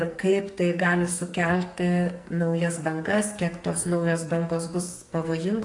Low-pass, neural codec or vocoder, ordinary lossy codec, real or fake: 10.8 kHz; codec, 44.1 kHz, 2.6 kbps, SNAC; AAC, 48 kbps; fake